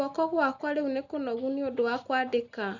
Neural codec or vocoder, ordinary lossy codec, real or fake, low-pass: none; none; real; 7.2 kHz